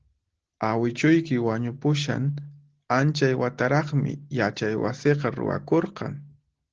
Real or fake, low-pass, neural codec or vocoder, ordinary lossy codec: real; 7.2 kHz; none; Opus, 16 kbps